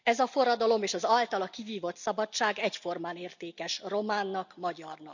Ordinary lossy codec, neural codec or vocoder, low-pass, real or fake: none; none; 7.2 kHz; real